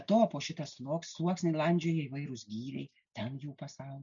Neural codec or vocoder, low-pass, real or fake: none; 7.2 kHz; real